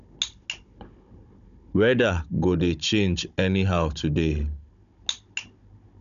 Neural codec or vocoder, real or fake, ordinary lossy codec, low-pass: codec, 16 kHz, 16 kbps, FunCodec, trained on Chinese and English, 50 frames a second; fake; none; 7.2 kHz